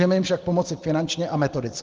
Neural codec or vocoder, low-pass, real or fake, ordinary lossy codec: none; 7.2 kHz; real; Opus, 16 kbps